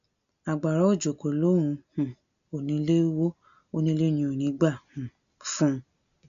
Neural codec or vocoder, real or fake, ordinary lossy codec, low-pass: none; real; none; 7.2 kHz